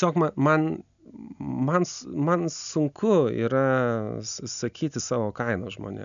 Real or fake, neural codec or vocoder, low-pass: real; none; 7.2 kHz